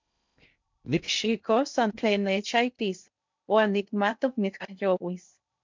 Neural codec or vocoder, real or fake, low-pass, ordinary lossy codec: codec, 16 kHz in and 24 kHz out, 0.6 kbps, FocalCodec, streaming, 2048 codes; fake; 7.2 kHz; MP3, 64 kbps